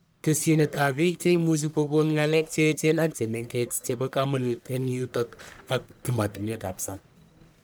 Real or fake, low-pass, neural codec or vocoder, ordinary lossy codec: fake; none; codec, 44.1 kHz, 1.7 kbps, Pupu-Codec; none